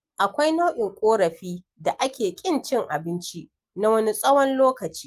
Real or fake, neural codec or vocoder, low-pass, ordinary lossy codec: real; none; 14.4 kHz; Opus, 32 kbps